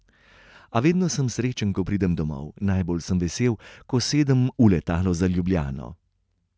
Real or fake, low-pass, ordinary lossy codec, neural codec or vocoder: real; none; none; none